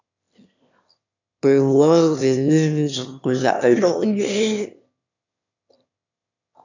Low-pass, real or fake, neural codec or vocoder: 7.2 kHz; fake; autoencoder, 22.05 kHz, a latent of 192 numbers a frame, VITS, trained on one speaker